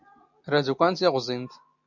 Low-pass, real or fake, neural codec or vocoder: 7.2 kHz; real; none